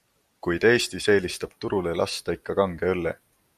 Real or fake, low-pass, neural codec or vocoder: real; 14.4 kHz; none